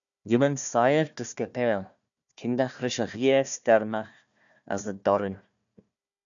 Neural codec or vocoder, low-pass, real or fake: codec, 16 kHz, 1 kbps, FunCodec, trained on Chinese and English, 50 frames a second; 7.2 kHz; fake